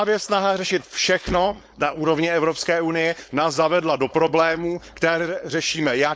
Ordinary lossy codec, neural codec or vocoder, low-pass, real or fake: none; codec, 16 kHz, 4.8 kbps, FACodec; none; fake